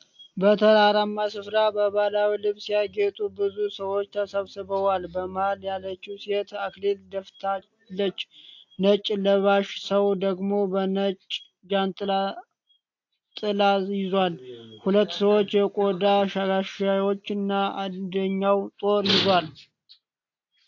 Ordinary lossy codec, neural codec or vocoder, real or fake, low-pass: AAC, 48 kbps; none; real; 7.2 kHz